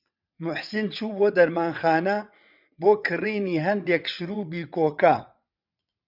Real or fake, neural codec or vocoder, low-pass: fake; vocoder, 22.05 kHz, 80 mel bands, WaveNeXt; 5.4 kHz